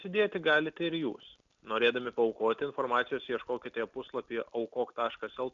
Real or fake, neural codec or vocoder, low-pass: real; none; 7.2 kHz